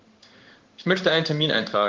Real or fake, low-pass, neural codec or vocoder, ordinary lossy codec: fake; 7.2 kHz; codec, 16 kHz in and 24 kHz out, 1 kbps, XY-Tokenizer; Opus, 16 kbps